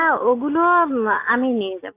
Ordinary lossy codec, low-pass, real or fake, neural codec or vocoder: AAC, 24 kbps; 3.6 kHz; real; none